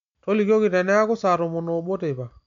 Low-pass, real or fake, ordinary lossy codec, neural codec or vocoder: 7.2 kHz; real; MP3, 64 kbps; none